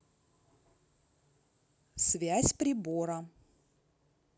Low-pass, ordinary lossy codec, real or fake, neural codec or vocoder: none; none; real; none